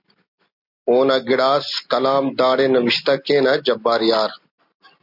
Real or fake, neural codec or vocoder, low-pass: real; none; 5.4 kHz